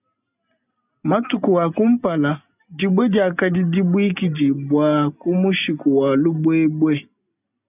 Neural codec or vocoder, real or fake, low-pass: none; real; 3.6 kHz